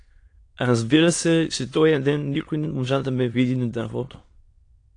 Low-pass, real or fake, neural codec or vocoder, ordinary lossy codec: 9.9 kHz; fake; autoencoder, 22.05 kHz, a latent of 192 numbers a frame, VITS, trained on many speakers; AAC, 48 kbps